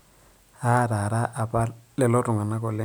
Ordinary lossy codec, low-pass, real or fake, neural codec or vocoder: none; none; real; none